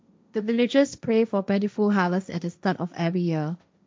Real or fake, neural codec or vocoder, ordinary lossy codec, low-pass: fake; codec, 16 kHz, 1.1 kbps, Voila-Tokenizer; none; none